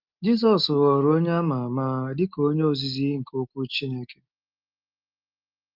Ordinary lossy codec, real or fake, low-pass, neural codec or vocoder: Opus, 24 kbps; real; 5.4 kHz; none